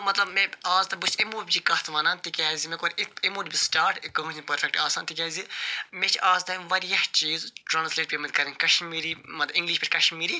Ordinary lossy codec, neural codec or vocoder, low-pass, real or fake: none; none; none; real